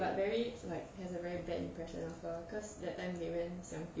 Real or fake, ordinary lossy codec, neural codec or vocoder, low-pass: real; none; none; none